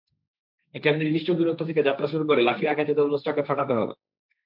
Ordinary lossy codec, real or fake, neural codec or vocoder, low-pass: MP3, 48 kbps; fake; codec, 16 kHz, 1.1 kbps, Voila-Tokenizer; 5.4 kHz